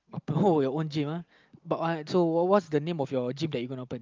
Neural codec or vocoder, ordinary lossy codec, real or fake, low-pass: none; Opus, 24 kbps; real; 7.2 kHz